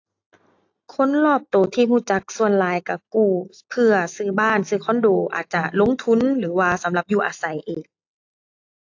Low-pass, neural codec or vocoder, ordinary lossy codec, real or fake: 7.2 kHz; none; AAC, 48 kbps; real